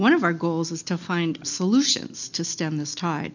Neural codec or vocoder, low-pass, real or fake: none; 7.2 kHz; real